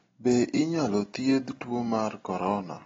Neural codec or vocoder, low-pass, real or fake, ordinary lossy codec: none; 7.2 kHz; real; AAC, 24 kbps